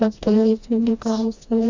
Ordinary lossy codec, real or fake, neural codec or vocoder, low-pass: MP3, 64 kbps; fake; codec, 16 kHz, 1 kbps, FreqCodec, smaller model; 7.2 kHz